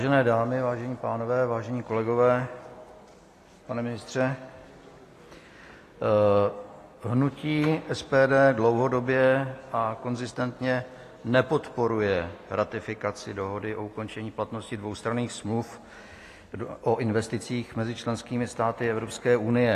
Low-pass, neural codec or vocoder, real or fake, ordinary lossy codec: 14.4 kHz; none; real; AAC, 48 kbps